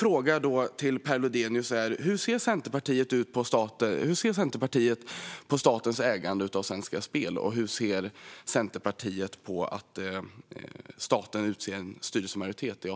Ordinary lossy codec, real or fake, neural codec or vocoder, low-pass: none; real; none; none